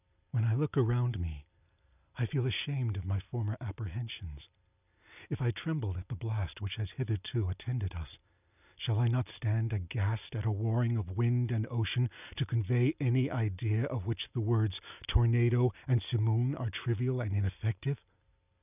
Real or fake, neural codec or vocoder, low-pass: real; none; 3.6 kHz